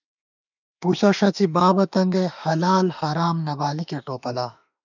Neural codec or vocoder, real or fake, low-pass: autoencoder, 48 kHz, 32 numbers a frame, DAC-VAE, trained on Japanese speech; fake; 7.2 kHz